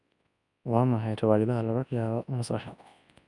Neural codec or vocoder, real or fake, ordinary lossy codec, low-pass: codec, 24 kHz, 0.9 kbps, WavTokenizer, large speech release; fake; none; 10.8 kHz